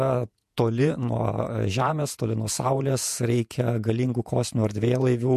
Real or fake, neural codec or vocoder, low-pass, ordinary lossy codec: fake; vocoder, 48 kHz, 128 mel bands, Vocos; 14.4 kHz; MP3, 64 kbps